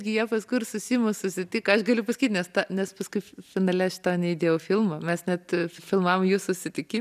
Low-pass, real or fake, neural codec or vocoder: 14.4 kHz; real; none